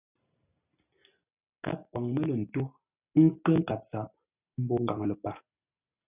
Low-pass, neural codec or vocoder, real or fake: 3.6 kHz; none; real